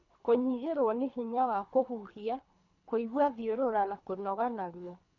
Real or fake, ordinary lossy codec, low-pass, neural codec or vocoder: fake; none; 7.2 kHz; codec, 24 kHz, 3 kbps, HILCodec